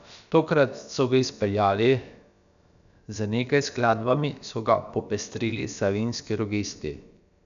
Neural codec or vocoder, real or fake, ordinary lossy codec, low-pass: codec, 16 kHz, about 1 kbps, DyCAST, with the encoder's durations; fake; none; 7.2 kHz